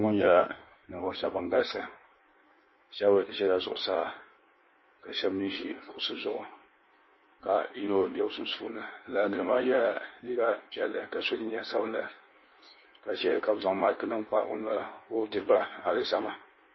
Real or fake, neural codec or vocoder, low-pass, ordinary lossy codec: fake; codec, 16 kHz in and 24 kHz out, 1.1 kbps, FireRedTTS-2 codec; 7.2 kHz; MP3, 24 kbps